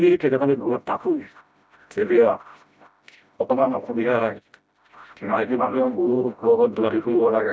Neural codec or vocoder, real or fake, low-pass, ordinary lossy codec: codec, 16 kHz, 0.5 kbps, FreqCodec, smaller model; fake; none; none